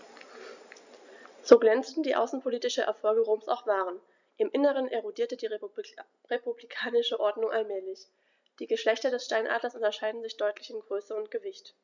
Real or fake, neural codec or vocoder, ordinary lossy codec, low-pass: real; none; none; 7.2 kHz